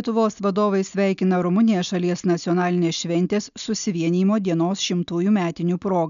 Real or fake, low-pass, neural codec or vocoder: real; 7.2 kHz; none